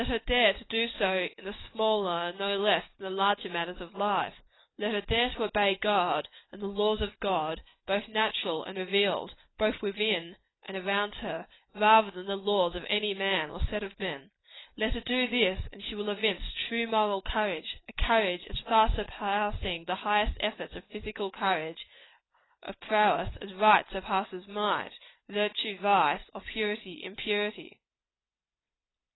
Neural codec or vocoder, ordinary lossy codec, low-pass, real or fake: none; AAC, 16 kbps; 7.2 kHz; real